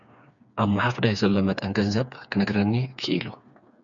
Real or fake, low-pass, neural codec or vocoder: fake; 7.2 kHz; codec, 16 kHz, 4 kbps, FreqCodec, smaller model